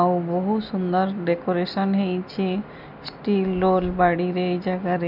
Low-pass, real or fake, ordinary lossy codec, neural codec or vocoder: 5.4 kHz; real; none; none